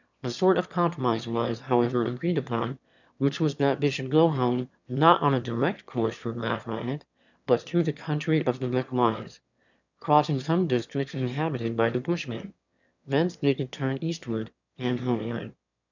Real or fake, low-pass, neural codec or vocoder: fake; 7.2 kHz; autoencoder, 22.05 kHz, a latent of 192 numbers a frame, VITS, trained on one speaker